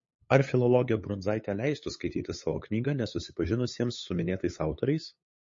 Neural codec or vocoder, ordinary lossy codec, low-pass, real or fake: codec, 16 kHz, 8 kbps, FunCodec, trained on LibriTTS, 25 frames a second; MP3, 32 kbps; 7.2 kHz; fake